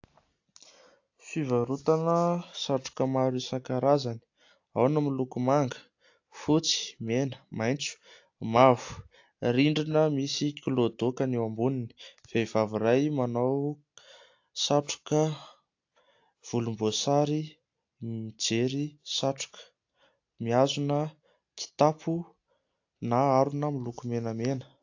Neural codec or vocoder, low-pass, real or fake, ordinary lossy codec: none; 7.2 kHz; real; AAC, 48 kbps